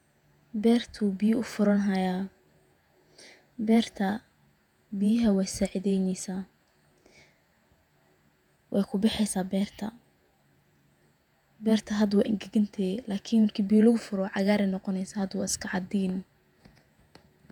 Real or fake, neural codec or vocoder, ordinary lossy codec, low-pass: fake; vocoder, 48 kHz, 128 mel bands, Vocos; none; 19.8 kHz